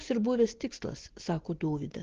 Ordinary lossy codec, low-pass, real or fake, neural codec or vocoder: Opus, 16 kbps; 7.2 kHz; real; none